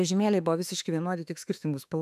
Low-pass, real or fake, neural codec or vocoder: 14.4 kHz; fake; autoencoder, 48 kHz, 32 numbers a frame, DAC-VAE, trained on Japanese speech